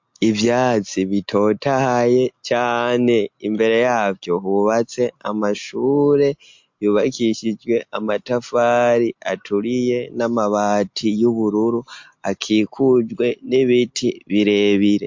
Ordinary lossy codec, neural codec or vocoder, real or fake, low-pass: MP3, 48 kbps; none; real; 7.2 kHz